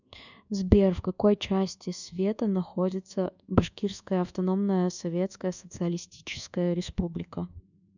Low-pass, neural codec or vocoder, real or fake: 7.2 kHz; codec, 24 kHz, 1.2 kbps, DualCodec; fake